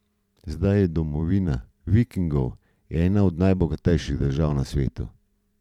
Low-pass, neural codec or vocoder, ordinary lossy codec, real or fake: 19.8 kHz; vocoder, 44.1 kHz, 128 mel bands every 512 samples, BigVGAN v2; none; fake